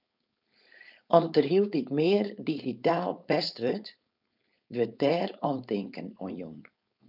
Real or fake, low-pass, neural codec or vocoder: fake; 5.4 kHz; codec, 16 kHz, 4.8 kbps, FACodec